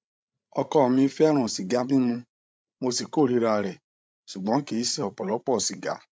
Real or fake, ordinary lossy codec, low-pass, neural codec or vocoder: fake; none; none; codec, 16 kHz, 16 kbps, FreqCodec, larger model